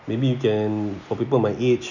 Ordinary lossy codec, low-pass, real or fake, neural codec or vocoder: none; 7.2 kHz; real; none